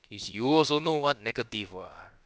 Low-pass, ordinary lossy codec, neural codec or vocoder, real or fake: none; none; codec, 16 kHz, about 1 kbps, DyCAST, with the encoder's durations; fake